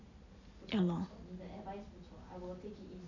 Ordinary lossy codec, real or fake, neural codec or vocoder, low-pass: none; real; none; 7.2 kHz